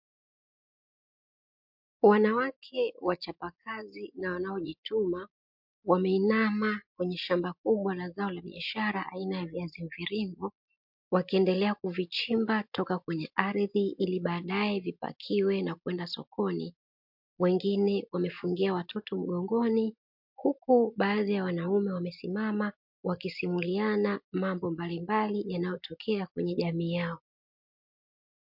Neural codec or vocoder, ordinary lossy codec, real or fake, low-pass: none; MP3, 48 kbps; real; 5.4 kHz